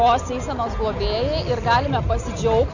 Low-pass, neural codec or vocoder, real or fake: 7.2 kHz; none; real